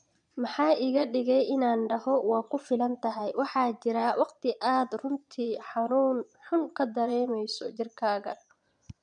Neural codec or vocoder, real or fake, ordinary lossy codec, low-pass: vocoder, 22.05 kHz, 80 mel bands, Vocos; fake; none; 9.9 kHz